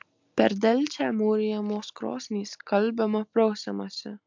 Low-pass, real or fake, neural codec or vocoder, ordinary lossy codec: 7.2 kHz; real; none; MP3, 64 kbps